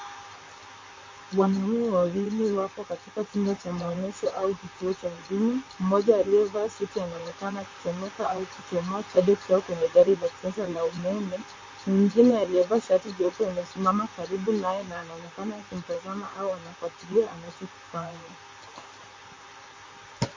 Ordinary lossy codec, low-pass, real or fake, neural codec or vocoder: MP3, 32 kbps; 7.2 kHz; fake; vocoder, 44.1 kHz, 128 mel bands, Pupu-Vocoder